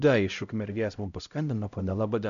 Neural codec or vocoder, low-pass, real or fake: codec, 16 kHz, 0.5 kbps, X-Codec, HuBERT features, trained on LibriSpeech; 7.2 kHz; fake